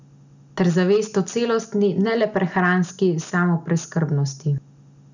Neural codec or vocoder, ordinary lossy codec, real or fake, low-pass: none; none; real; 7.2 kHz